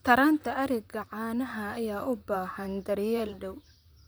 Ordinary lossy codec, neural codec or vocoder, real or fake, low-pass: none; vocoder, 44.1 kHz, 128 mel bands, Pupu-Vocoder; fake; none